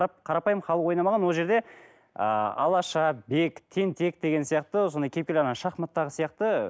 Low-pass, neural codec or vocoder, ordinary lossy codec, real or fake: none; none; none; real